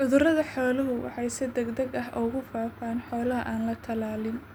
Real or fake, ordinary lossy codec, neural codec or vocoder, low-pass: real; none; none; none